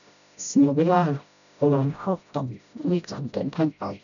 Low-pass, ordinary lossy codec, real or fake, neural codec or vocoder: 7.2 kHz; none; fake; codec, 16 kHz, 0.5 kbps, FreqCodec, smaller model